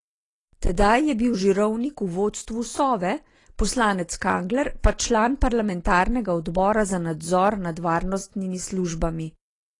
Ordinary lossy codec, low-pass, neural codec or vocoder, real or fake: AAC, 32 kbps; 10.8 kHz; none; real